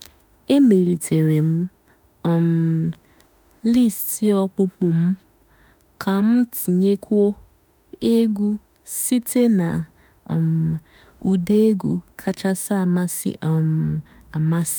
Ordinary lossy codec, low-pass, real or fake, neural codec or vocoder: none; none; fake; autoencoder, 48 kHz, 32 numbers a frame, DAC-VAE, trained on Japanese speech